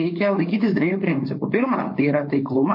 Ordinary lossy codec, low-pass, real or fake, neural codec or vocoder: MP3, 32 kbps; 5.4 kHz; fake; codec, 16 kHz, 4.8 kbps, FACodec